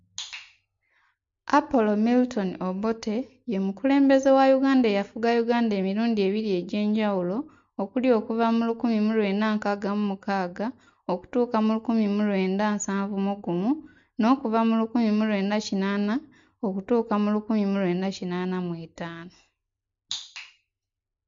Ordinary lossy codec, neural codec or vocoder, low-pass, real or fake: MP3, 48 kbps; none; 7.2 kHz; real